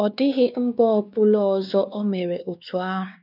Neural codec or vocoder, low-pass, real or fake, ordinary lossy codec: codec, 16 kHz, 2 kbps, X-Codec, WavLM features, trained on Multilingual LibriSpeech; 5.4 kHz; fake; none